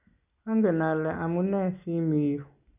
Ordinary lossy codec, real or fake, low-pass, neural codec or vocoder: none; real; 3.6 kHz; none